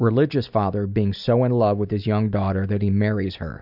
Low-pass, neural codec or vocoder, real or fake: 5.4 kHz; none; real